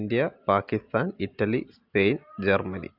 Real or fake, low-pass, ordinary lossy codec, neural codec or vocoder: real; 5.4 kHz; AAC, 48 kbps; none